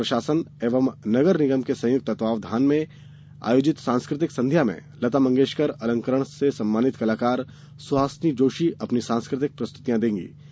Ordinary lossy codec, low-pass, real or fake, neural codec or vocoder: none; none; real; none